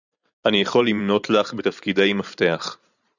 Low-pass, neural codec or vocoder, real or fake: 7.2 kHz; vocoder, 44.1 kHz, 128 mel bands every 512 samples, BigVGAN v2; fake